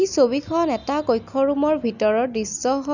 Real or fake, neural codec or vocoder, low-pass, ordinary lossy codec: real; none; 7.2 kHz; none